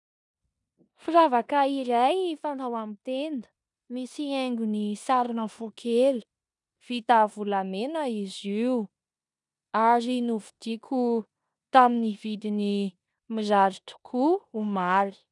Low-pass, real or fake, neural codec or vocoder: 10.8 kHz; fake; codec, 16 kHz in and 24 kHz out, 0.9 kbps, LongCat-Audio-Codec, four codebook decoder